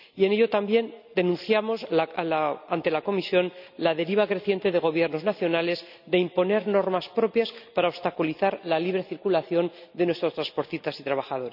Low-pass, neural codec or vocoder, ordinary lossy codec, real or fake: 5.4 kHz; none; none; real